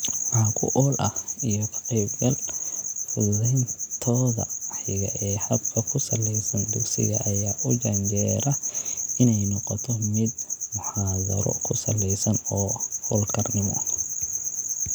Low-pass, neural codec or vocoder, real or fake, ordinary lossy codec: none; none; real; none